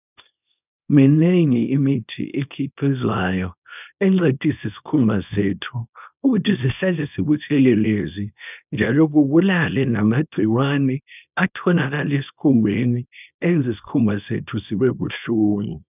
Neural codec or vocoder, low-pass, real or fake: codec, 24 kHz, 0.9 kbps, WavTokenizer, small release; 3.6 kHz; fake